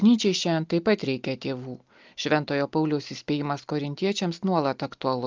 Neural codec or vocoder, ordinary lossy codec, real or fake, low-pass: none; Opus, 24 kbps; real; 7.2 kHz